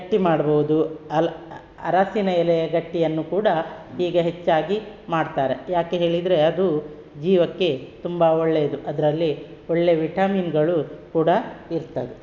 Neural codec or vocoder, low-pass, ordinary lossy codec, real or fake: none; none; none; real